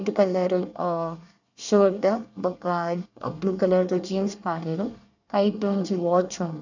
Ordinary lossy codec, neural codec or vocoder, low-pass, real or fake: none; codec, 24 kHz, 1 kbps, SNAC; 7.2 kHz; fake